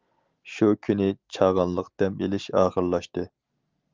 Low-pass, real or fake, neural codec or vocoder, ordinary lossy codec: 7.2 kHz; real; none; Opus, 24 kbps